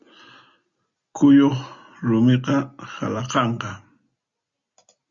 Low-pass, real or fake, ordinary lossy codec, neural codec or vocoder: 7.2 kHz; real; Opus, 64 kbps; none